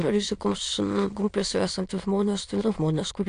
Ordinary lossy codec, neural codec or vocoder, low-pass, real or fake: AAC, 48 kbps; autoencoder, 22.05 kHz, a latent of 192 numbers a frame, VITS, trained on many speakers; 9.9 kHz; fake